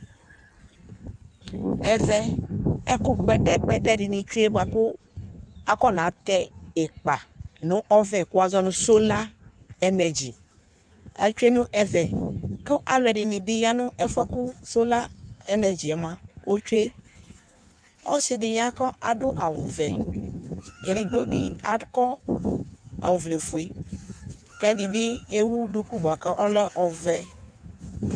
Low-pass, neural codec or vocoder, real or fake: 9.9 kHz; codec, 16 kHz in and 24 kHz out, 1.1 kbps, FireRedTTS-2 codec; fake